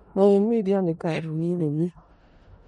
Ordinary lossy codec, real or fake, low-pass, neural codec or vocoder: MP3, 48 kbps; fake; 10.8 kHz; codec, 16 kHz in and 24 kHz out, 0.4 kbps, LongCat-Audio-Codec, four codebook decoder